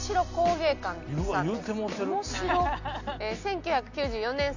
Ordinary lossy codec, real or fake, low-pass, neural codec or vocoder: none; real; 7.2 kHz; none